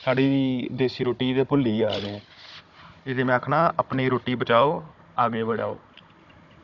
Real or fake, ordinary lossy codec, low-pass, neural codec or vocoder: fake; none; 7.2 kHz; codec, 16 kHz, 16 kbps, FunCodec, trained on Chinese and English, 50 frames a second